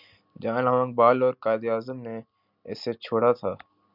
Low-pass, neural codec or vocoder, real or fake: 5.4 kHz; none; real